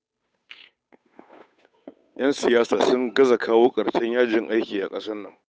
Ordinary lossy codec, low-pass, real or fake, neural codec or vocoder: none; none; fake; codec, 16 kHz, 8 kbps, FunCodec, trained on Chinese and English, 25 frames a second